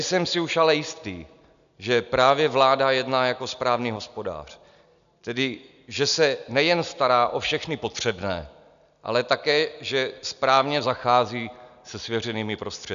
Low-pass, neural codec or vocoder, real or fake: 7.2 kHz; none; real